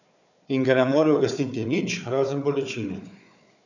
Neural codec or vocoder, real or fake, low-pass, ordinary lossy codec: codec, 16 kHz, 4 kbps, FunCodec, trained on Chinese and English, 50 frames a second; fake; 7.2 kHz; none